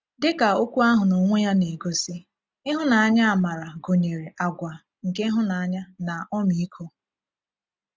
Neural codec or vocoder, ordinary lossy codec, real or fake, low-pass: none; none; real; none